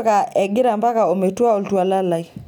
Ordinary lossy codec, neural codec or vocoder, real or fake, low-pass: none; none; real; 19.8 kHz